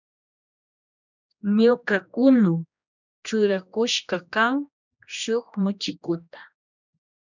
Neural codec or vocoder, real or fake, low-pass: codec, 16 kHz, 1 kbps, X-Codec, HuBERT features, trained on general audio; fake; 7.2 kHz